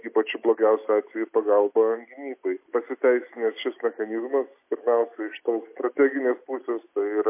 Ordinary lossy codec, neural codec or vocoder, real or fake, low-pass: MP3, 24 kbps; none; real; 3.6 kHz